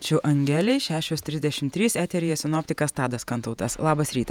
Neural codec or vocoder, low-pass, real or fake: vocoder, 48 kHz, 128 mel bands, Vocos; 19.8 kHz; fake